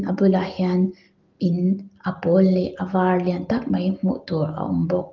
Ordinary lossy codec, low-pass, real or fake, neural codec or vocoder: Opus, 32 kbps; 7.2 kHz; real; none